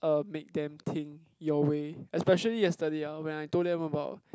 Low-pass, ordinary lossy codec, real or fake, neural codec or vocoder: none; none; real; none